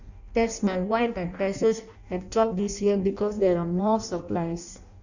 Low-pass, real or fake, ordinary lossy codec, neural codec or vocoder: 7.2 kHz; fake; none; codec, 16 kHz in and 24 kHz out, 0.6 kbps, FireRedTTS-2 codec